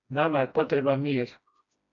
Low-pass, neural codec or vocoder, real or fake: 7.2 kHz; codec, 16 kHz, 1 kbps, FreqCodec, smaller model; fake